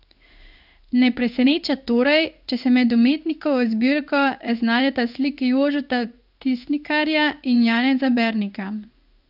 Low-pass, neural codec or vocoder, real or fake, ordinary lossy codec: 5.4 kHz; codec, 16 kHz in and 24 kHz out, 1 kbps, XY-Tokenizer; fake; none